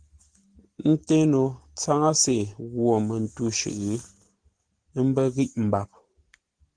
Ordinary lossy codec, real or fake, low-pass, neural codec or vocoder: Opus, 16 kbps; real; 9.9 kHz; none